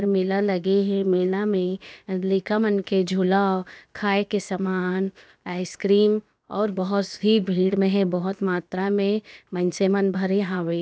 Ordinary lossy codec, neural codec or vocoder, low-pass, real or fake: none; codec, 16 kHz, about 1 kbps, DyCAST, with the encoder's durations; none; fake